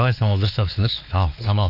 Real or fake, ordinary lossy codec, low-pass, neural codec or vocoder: fake; none; 5.4 kHz; codec, 16 kHz, 2 kbps, X-Codec, WavLM features, trained on Multilingual LibriSpeech